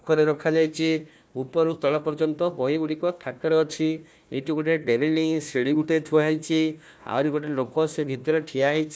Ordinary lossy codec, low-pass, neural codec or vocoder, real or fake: none; none; codec, 16 kHz, 1 kbps, FunCodec, trained on Chinese and English, 50 frames a second; fake